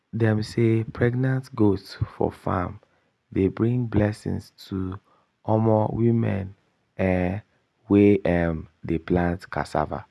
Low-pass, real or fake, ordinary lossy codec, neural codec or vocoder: none; real; none; none